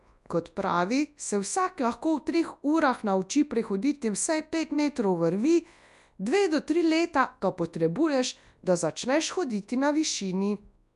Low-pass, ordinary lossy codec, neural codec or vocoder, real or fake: 10.8 kHz; none; codec, 24 kHz, 0.9 kbps, WavTokenizer, large speech release; fake